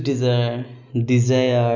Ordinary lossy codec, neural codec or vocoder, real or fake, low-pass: none; none; real; 7.2 kHz